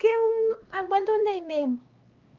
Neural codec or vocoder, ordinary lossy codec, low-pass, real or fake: codec, 16 kHz, 2 kbps, X-Codec, HuBERT features, trained on LibriSpeech; Opus, 24 kbps; 7.2 kHz; fake